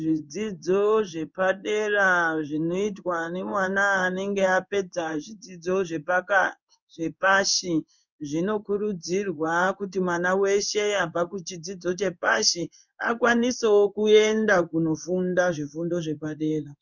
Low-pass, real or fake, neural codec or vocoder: 7.2 kHz; fake; codec, 16 kHz in and 24 kHz out, 1 kbps, XY-Tokenizer